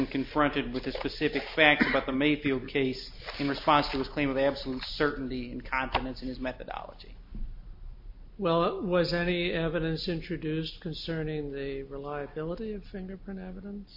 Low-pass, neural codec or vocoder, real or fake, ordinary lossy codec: 5.4 kHz; none; real; MP3, 32 kbps